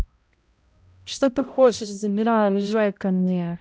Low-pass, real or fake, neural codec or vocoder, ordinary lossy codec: none; fake; codec, 16 kHz, 0.5 kbps, X-Codec, HuBERT features, trained on balanced general audio; none